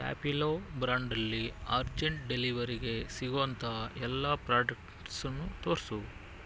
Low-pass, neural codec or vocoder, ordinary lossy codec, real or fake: none; none; none; real